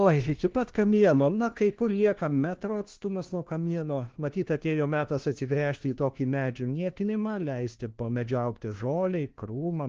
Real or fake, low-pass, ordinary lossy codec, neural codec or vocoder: fake; 7.2 kHz; Opus, 16 kbps; codec, 16 kHz, 1 kbps, FunCodec, trained on LibriTTS, 50 frames a second